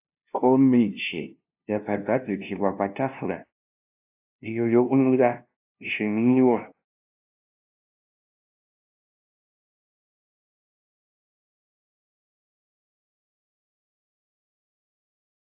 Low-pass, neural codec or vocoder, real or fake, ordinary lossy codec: 3.6 kHz; codec, 16 kHz, 0.5 kbps, FunCodec, trained on LibriTTS, 25 frames a second; fake; AAC, 32 kbps